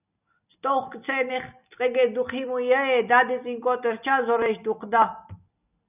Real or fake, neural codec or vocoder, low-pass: real; none; 3.6 kHz